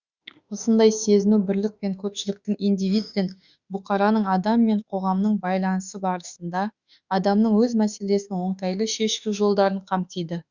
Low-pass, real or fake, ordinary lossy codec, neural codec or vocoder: 7.2 kHz; fake; Opus, 64 kbps; autoencoder, 48 kHz, 32 numbers a frame, DAC-VAE, trained on Japanese speech